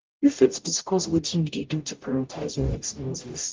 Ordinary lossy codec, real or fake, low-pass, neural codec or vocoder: Opus, 24 kbps; fake; 7.2 kHz; codec, 44.1 kHz, 0.9 kbps, DAC